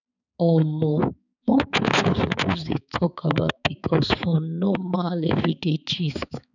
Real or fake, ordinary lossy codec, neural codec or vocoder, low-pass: fake; none; codec, 16 kHz, 4 kbps, X-Codec, HuBERT features, trained on balanced general audio; 7.2 kHz